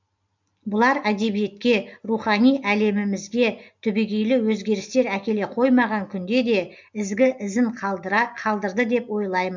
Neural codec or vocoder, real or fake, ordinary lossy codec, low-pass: none; real; MP3, 64 kbps; 7.2 kHz